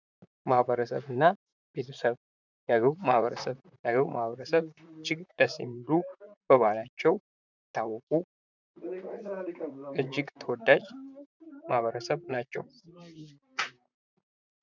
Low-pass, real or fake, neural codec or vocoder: 7.2 kHz; fake; vocoder, 44.1 kHz, 128 mel bands, Pupu-Vocoder